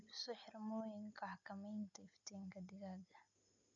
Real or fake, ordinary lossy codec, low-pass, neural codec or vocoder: real; none; 7.2 kHz; none